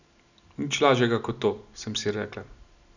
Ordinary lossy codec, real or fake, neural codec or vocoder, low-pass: none; real; none; 7.2 kHz